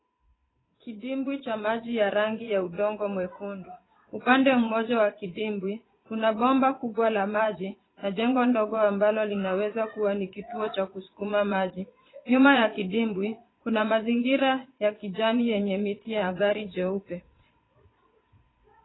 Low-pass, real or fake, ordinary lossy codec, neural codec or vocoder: 7.2 kHz; fake; AAC, 16 kbps; vocoder, 22.05 kHz, 80 mel bands, Vocos